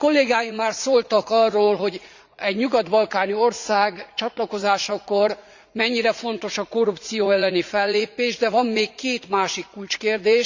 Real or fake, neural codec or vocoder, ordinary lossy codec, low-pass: fake; vocoder, 44.1 kHz, 80 mel bands, Vocos; Opus, 64 kbps; 7.2 kHz